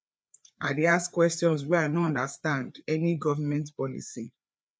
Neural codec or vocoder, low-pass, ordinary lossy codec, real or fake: codec, 16 kHz, 4 kbps, FreqCodec, larger model; none; none; fake